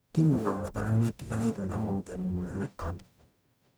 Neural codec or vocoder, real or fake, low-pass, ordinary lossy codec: codec, 44.1 kHz, 0.9 kbps, DAC; fake; none; none